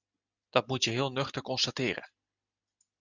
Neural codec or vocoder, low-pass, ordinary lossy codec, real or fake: none; 7.2 kHz; Opus, 64 kbps; real